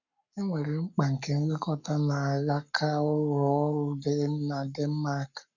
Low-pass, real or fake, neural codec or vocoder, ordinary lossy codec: 7.2 kHz; fake; codec, 44.1 kHz, 7.8 kbps, Pupu-Codec; none